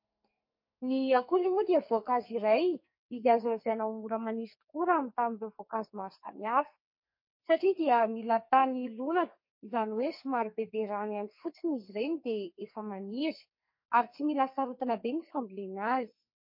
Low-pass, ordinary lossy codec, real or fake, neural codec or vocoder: 5.4 kHz; MP3, 32 kbps; fake; codec, 44.1 kHz, 2.6 kbps, SNAC